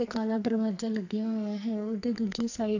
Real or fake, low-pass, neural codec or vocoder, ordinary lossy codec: fake; 7.2 kHz; codec, 44.1 kHz, 2.6 kbps, SNAC; none